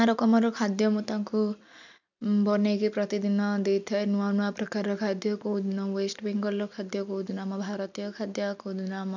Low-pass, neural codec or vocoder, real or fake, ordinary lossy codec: 7.2 kHz; none; real; none